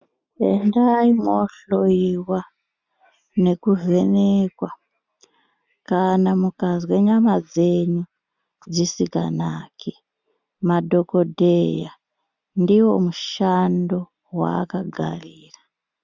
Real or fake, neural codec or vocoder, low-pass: real; none; 7.2 kHz